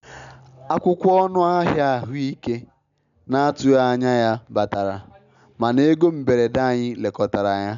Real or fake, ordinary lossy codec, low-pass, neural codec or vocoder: real; none; 7.2 kHz; none